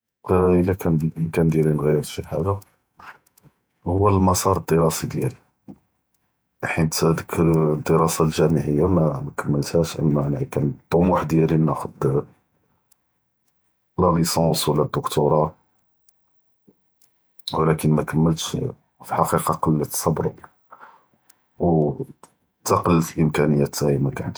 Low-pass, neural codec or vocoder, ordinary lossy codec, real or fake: none; vocoder, 48 kHz, 128 mel bands, Vocos; none; fake